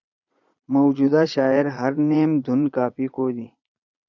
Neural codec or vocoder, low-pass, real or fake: vocoder, 22.05 kHz, 80 mel bands, Vocos; 7.2 kHz; fake